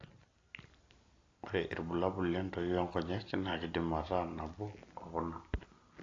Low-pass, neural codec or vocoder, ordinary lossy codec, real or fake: 7.2 kHz; none; AAC, 32 kbps; real